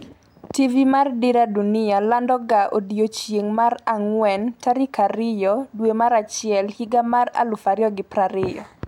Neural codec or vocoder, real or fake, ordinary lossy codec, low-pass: none; real; none; 19.8 kHz